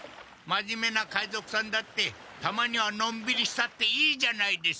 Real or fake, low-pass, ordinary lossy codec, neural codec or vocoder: real; none; none; none